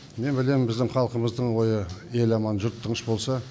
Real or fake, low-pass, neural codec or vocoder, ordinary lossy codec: real; none; none; none